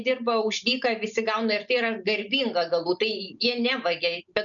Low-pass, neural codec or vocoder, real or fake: 7.2 kHz; none; real